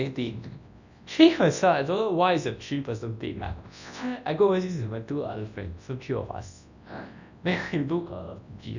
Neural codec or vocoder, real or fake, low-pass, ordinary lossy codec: codec, 24 kHz, 0.9 kbps, WavTokenizer, large speech release; fake; 7.2 kHz; none